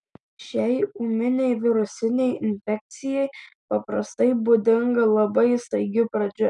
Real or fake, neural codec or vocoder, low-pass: real; none; 10.8 kHz